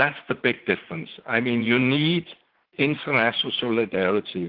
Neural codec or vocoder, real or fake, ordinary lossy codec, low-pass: vocoder, 44.1 kHz, 80 mel bands, Vocos; fake; Opus, 16 kbps; 5.4 kHz